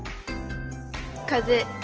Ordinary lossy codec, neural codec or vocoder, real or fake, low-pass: Opus, 16 kbps; none; real; 7.2 kHz